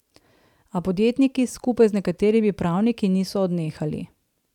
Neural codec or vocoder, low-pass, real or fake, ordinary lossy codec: vocoder, 44.1 kHz, 128 mel bands every 512 samples, BigVGAN v2; 19.8 kHz; fake; none